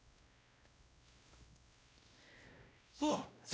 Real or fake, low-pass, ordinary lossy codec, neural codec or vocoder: fake; none; none; codec, 16 kHz, 0.5 kbps, X-Codec, WavLM features, trained on Multilingual LibriSpeech